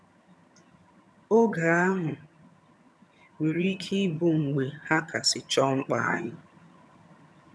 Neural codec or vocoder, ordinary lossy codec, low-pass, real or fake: vocoder, 22.05 kHz, 80 mel bands, HiFi-GAN; none; none; fake